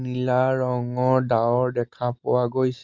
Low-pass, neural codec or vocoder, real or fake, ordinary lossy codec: 7.2 kHz; none; real; Opus, 32 kbps